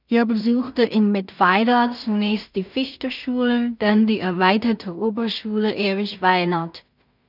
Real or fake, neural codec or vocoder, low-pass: fake; codec, 16 kHz in and 24 kHz out, 0.4 kbps, LongCat-Audio-Codec, two codebook decoder; 5.4 kHz